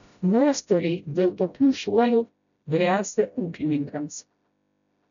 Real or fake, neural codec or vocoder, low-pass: fake; codec, 16 kHz, 0.5 kbps, FreqCodec, smaller model; 7.2 kHz